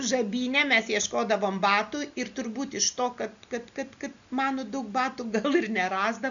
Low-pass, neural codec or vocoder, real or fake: 7.2 kHz; none; real